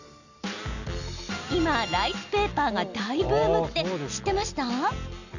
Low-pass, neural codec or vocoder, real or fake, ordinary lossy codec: 7.2 kHz; none; real; none